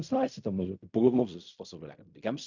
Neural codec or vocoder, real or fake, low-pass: codec, 16 kHz in and 24 kHz out, 0.4 kbps, LongCat-Audio-Codec, fine tuned four codebook decoder; fake; 7.2 kHz